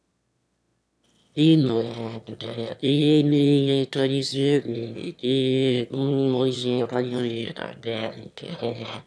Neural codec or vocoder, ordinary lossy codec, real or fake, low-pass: autoencoder, 22.05 kHz, a latent of 192 numbers a frame, VITS, trained on one speaker; none; fake; none